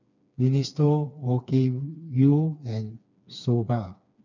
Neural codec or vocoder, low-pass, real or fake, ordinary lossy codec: codec, 16 kHz, 4 kbps, FreqCodec, smaller model; 7.2 kHz; fake; none